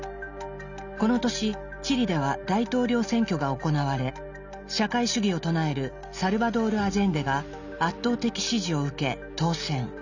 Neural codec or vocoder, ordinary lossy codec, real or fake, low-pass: none; none; real; 7.2 kHz